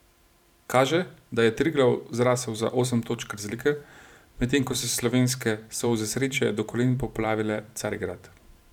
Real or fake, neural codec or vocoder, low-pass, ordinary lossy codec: fake; vocoder, 44.1 kHz, 128 mel bands every 512 samples, BigVGAN v2; 19.8 kHz; none